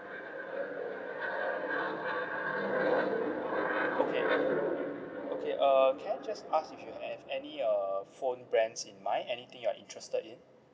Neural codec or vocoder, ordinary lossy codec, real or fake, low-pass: none; none; real; none